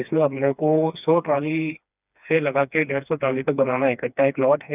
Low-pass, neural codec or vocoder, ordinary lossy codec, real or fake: 3.6 kHz; codec, 16 kHz, 2 kbps, FreqCodec, smaller model; none; fake